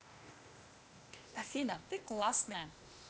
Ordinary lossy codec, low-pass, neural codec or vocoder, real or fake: none; none; codec, 16 kHz, 0.8 kbps, ZipCodec; fake